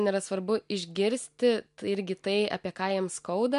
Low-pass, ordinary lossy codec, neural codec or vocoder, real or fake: 10.8 kHz; MP3, 64 kbps; none; real